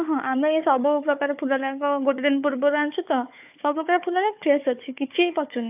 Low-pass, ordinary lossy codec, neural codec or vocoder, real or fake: 3.6 kHz; AAC, 32 kbps; codec, 16 kHz, 4 kbps, FunCodec, trained on Chinese and English, 50 frames a second; fake